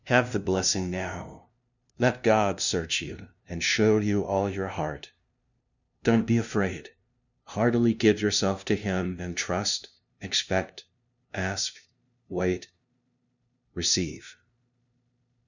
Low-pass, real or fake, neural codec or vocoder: 7.2 kHz; fake; codec, 16 kHz, 0.5 kbps, FunCodec, trained on LibriTTS, 25 frames a second